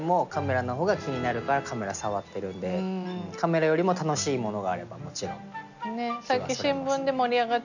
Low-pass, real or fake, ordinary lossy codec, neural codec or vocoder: 7.2 kHz; real; none; none